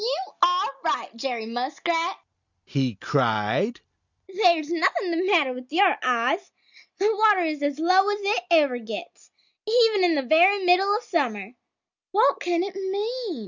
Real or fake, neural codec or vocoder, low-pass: real; none; 7.2 kHz